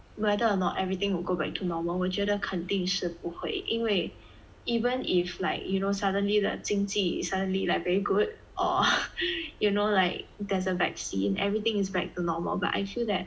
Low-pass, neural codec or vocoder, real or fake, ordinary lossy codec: none; none; real; none